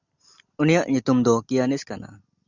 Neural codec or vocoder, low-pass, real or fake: none; 7.2 kHz; real